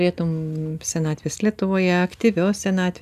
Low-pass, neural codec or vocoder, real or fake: 14.4 kHz; none; real